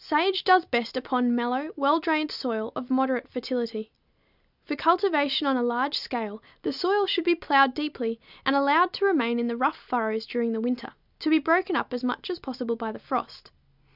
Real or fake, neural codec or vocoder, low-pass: real; none; 5.4 kHz